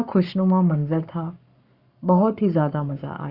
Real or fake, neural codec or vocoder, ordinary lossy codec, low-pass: fake; codec, 44.1 kHz, 7.8 kbps, Pupu-Codec; Opus, 64 kbps; 5.4 kHz